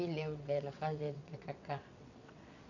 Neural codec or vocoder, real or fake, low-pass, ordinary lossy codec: vocoder, 22.05 kHz, 80 mel bands, WaveNeXt; fake; 7.2 kHz; none